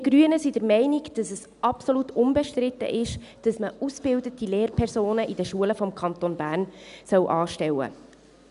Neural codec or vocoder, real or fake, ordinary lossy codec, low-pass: none; real; none; 10.8 kHz